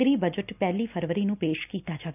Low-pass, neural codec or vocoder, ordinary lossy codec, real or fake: 3.6 kHz; none; none; real